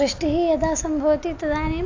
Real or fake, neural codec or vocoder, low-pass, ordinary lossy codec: real; none; 7.2 kHz; AAC, 48 kbps